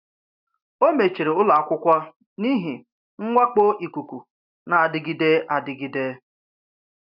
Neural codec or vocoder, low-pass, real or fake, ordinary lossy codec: none; 5.4 kHz; real; none